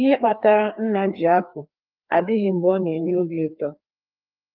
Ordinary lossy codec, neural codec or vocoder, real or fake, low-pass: Opus, 32 kbps; codec, 16 kHz, 2 kbps, FreqCodec, larger model; fake; 5.4 kHz